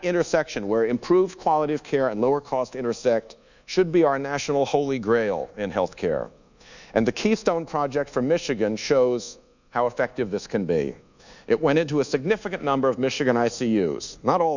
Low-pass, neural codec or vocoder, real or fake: 7.2 kHz; codec, 24 kHz, 1.2 kbps, DualCodec; fake